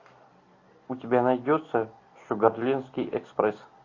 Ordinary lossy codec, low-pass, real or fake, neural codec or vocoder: AAC, 32 kbps; 7.2 kHz; real; none